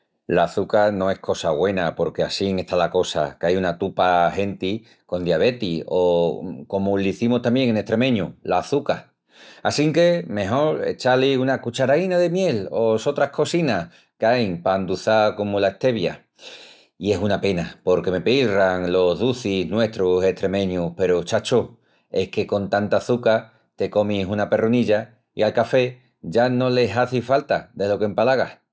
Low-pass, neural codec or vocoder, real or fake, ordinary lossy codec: none; none; real; none